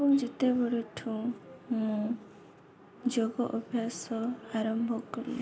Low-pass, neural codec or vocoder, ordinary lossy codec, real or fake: none; none; none; real